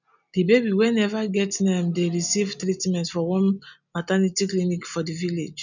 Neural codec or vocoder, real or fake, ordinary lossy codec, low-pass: none; real; none; 7.2 kHz